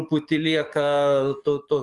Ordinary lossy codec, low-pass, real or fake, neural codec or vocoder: Opus, 32 kbps; 10.8 kHz; fake; codec, 44.1 kHz, 7.8 kbps, DAC